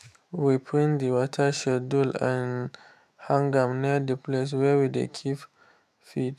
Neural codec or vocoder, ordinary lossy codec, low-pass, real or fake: autoencoder, 48 kHz, 128 numbers a frame, DAC-VAE, trained on Japanese speech; AAC, 96 kbps; 14.4 kHz; fake